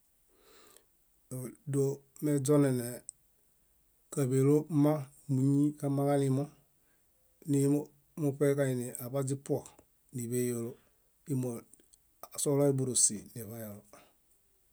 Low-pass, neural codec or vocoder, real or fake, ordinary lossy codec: none; none; real; none